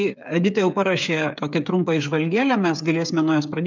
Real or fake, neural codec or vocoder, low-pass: fake; codec, 16 kHz, 8 kbps, FreqCodec, smaller model; 7.2 kHz